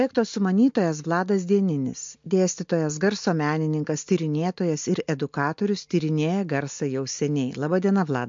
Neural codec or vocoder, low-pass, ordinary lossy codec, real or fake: none; 7.2 kHz; MP3, 48 kbps; real